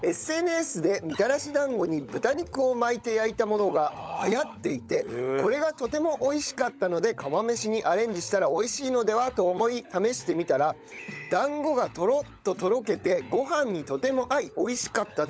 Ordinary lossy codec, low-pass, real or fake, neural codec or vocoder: none; none; fake; codec, 16 kHz, 16 kbps, FunCodec, trained on LibriTTS, 50 frames a second